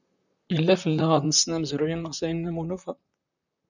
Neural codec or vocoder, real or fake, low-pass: vocoder, 44.1 kHz, 128 mel bands, Pupu-Vocoder; fake; 7.2 kHz